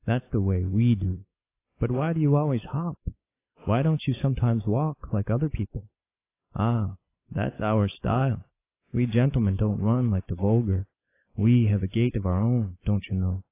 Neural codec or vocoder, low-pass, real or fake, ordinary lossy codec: none; 3.6 kHz; real; AAC, 24 kbps